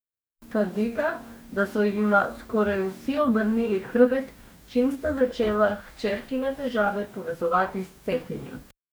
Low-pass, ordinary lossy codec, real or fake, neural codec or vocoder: none; none; fake; codec, 44.1 kHz, 2.6 kbps, DAC